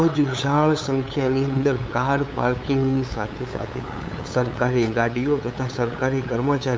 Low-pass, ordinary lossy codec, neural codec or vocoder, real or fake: none; none; codec, 16 kHz, 8 kbps, FunCodec, trained on LibriTTS, 25 frames a second; fake